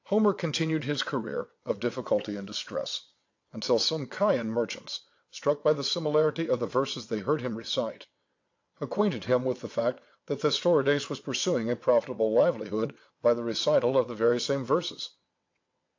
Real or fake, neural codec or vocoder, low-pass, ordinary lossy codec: fake; vocoder, 22.05 kHz, 80 mel bands, WaveNeXt; 7.2 kHz; AAC, 48 kbps